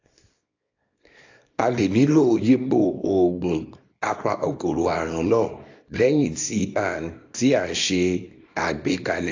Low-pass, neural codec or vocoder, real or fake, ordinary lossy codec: 7.2 kHz; codec, 24 kHz, 0.9 kbps, WavTokenizer, small release; fake; AAC, 48 kbps